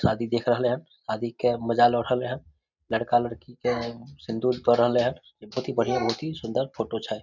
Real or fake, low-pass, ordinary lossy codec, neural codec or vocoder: real; 7.2 kHz; none; none